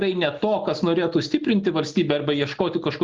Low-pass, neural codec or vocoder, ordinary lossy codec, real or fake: 7.2 kHz; none; Opus, 24 kbps; real